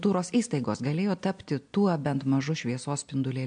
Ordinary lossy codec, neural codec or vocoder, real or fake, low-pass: MP3, 64 kbps; none; real; 9.9 kHz